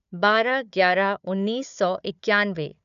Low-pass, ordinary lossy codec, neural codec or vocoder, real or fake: 7.2 kHz; none; codec, 16 kHz, 4 kbps, FunCodec, trained on Chinese and English, 50 frames a second; fake